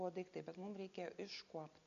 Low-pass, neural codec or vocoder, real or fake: 7.2 kHz; none; real